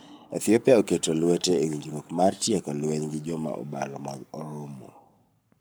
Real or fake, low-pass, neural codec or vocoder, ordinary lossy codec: fake; none; codec, 44.1 kHz, 7.8 kbps, Pupu-Codec; none